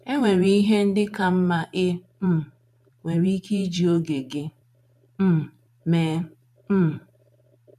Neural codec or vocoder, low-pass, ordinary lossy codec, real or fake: vocoder, 44.1 kHz, 128 mel bands every 512 samples, BigVGAN v2; 14.4 kHz; none; fake